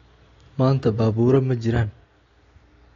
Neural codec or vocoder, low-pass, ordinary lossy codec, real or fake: none; 7.2 kHz; AAC, 32 kbps; real